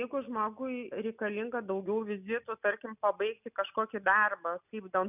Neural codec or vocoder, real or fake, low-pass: none; real; 3.6 kHz